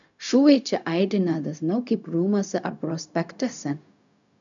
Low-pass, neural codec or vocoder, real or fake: 7.2 kHz; codec, 16 kHz, 0.4 kbps, LongCat-Audio-Codec; fake